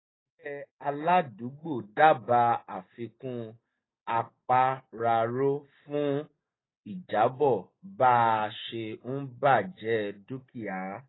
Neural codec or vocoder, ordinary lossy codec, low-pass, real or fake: none; AAC, 16 kbps; 7.2 kHz; real